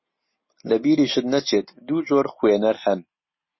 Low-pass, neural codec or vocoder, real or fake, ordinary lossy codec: 7.2 kHz; none; real; MP3, 24 kbps